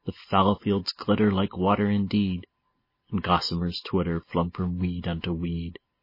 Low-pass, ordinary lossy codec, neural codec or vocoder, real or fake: 5.4 kHz; MP3, 24 kbps; none; real